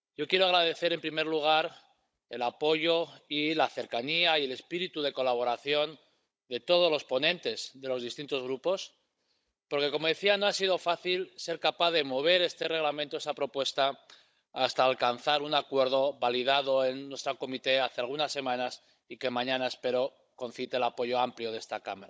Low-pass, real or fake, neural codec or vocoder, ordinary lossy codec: none; fake; codec, 16 kHz, 16 kbps, FunCodec, trained on Chinese and English, 50 frames a second; none